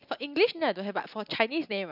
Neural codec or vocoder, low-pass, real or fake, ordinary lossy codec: none; 5.4 kHz; real; none